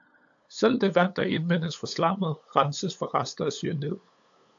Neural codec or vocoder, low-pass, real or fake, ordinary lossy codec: codec, 16 kHz, 8 kbps, FunCodec, trained on LibriTTS, 25 frames a second; 7.2 kHz; fake; AAC, 64 kbps